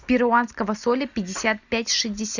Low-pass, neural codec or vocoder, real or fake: 7.2 kHz; none; real